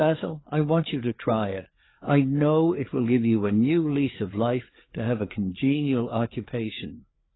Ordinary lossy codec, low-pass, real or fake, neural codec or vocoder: AAC, 16 kbps; 7.2 kHz; fake; codec, 16 kHz, 4 kbps, FreqCodec, larger model